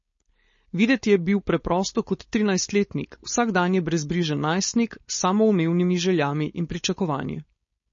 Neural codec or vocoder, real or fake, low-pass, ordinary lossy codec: codec, 16 kHz, 4.8 kbps, FACodec; fake; 7.2 kHz; MP3, 32 kbps